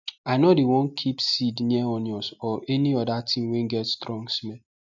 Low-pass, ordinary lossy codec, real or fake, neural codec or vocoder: 7.2 kHz; none; real; none